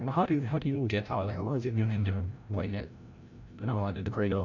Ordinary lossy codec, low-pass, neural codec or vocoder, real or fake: none; 7.2 kHz; codec, 16 kHz, 0.5 kbps, FreqCodec, larger model; fake